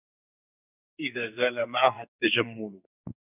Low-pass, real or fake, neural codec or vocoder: 3.6 kHz; fake; codec, 44.1 kHz, 2.6 kbps, SNAC